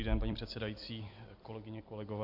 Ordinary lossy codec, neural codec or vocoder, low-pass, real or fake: MP3, 48 kbps; vocoder, 44.1 kHz, 128 mel bands every 256 samples, BigVGAN v2; 5.4 kHz; fake